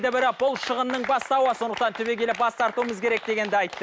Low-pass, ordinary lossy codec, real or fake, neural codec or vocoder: none; none; real; none